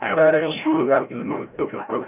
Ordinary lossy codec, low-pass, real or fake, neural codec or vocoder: none; 3.6 kHz; fake; codec, 16 kHz, 0.5 kbps, FreqCodec, larger model